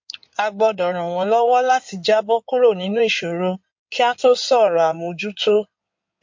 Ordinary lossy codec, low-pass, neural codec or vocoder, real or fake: MP3, 48 kbps; 7.2 kHz; codec, 16 kHz in and 24 kHz out, 2.2 kbps, FireRedTTS-2 codec; fake